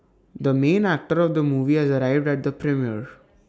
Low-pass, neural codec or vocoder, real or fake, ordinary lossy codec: none; none; real; none